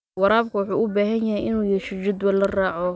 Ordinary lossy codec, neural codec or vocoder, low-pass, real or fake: none; none; none; real